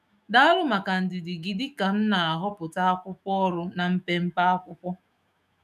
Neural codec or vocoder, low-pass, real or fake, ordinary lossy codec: autoencoder, 48 kHz, 128 numbers a frame, DAC-VAE, trained on Japanese speech; 14.4 kHz; fake; none